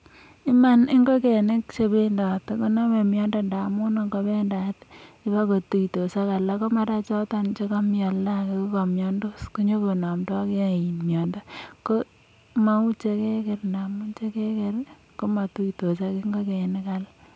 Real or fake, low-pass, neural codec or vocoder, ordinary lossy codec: real; none; none; none